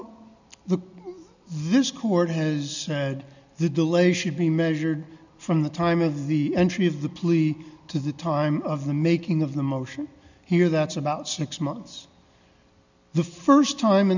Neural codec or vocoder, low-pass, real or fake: none; 7.2 kHz; real